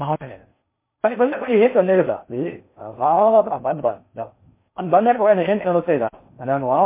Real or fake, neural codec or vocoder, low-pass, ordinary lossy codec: fake; codec, 16 kHz in and 24 kHz out, 0.6 kbps, FocalCodec, streaming, 4096 codes; 3.6 kHz; MP3, 24 kbps